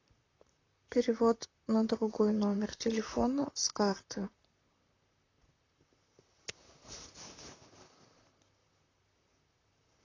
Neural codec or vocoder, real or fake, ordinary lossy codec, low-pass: vocoder, 44.1 kHz, 128 mel bands, Pupu-Vocoder; fake; AAC, 32 kbps; 7.2 kHz